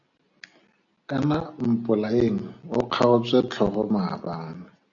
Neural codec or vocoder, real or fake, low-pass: none; real; 7.2 kHz